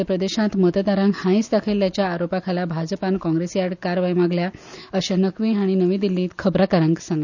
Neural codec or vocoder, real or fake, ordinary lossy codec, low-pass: none; real; none; 7.2 kHz